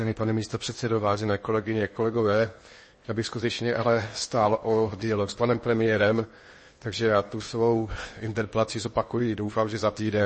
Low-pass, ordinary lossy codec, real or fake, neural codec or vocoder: 10.8 kHz; MP3, 32 kbps; fake; codec, 16 kHz in and 24 kHz out, 0.8 kbps, FocalCodec, streaming, 65536 codes